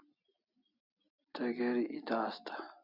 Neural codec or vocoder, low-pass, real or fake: none; 5.4 kHz; real